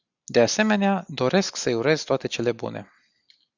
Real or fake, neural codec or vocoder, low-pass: real; none; 7.2 kHz